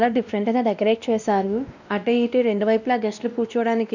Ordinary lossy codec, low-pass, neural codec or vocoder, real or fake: none; 7.2 kHz; codec, 16 kHz, 1 kbps, X-Codec, WavLM features, trained on Multilingual LibriSpeech; fake